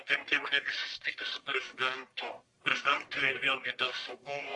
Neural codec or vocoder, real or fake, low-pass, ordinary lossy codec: codec, 44.1 kHz, 1.7 kbps, Pupu-Codec; fake; 10.8 kHz; AAC, 64 kbps